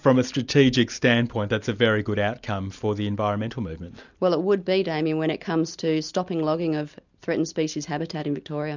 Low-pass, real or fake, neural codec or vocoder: 7.2 kHz; real; none